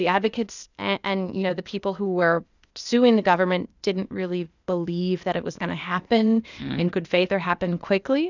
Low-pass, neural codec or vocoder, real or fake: 7.2 kHz; codec, 16 kHz, 0.8 kbps, ZipCodec; fake